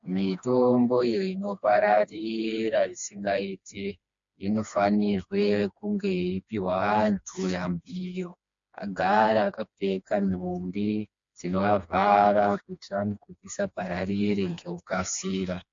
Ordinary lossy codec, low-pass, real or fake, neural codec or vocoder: MP3, 48 kbps; 7.2 kHz; fake; codec, 16 kHz, 2 kbps, FreqCodec, smaller model